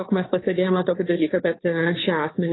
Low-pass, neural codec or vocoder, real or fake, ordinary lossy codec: 7.2 kHz; codec, 16 kHz, 2 kbps, FunCodec, trained on Chinese and English, 25 frames a second; fake; AAC, 16 kbps